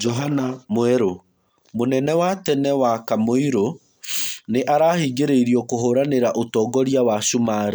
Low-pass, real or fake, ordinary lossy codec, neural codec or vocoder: none; real; none; none